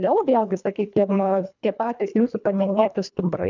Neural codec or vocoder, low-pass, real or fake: codec, 24 kHz, 1.5 kbps, HILCodec; 7.2 kHz; fake